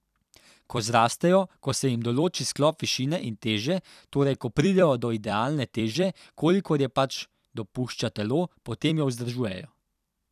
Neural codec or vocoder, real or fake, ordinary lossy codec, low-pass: vocoder, 44.1 kHz, 128 mel bands every 256 samples, BigVGAN v2; fake; none; 14.4 kHz